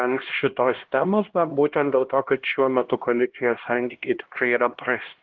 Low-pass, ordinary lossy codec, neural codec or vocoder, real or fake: 7.2 kHz; Opus, 16 kbps; codec, 16 kHz, 1 kbps, X-Codec, HuBERT features, trained on LibriSpeech; fake